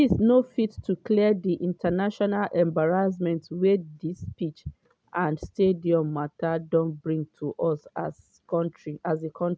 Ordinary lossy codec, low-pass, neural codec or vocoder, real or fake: none; none; none; real